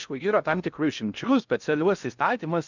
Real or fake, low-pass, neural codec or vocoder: fake; 7.2 kHz; codec, 16 kHz in and 24 kHz out, 0.6 kbps, FocalCodec, streaming, 2048 codes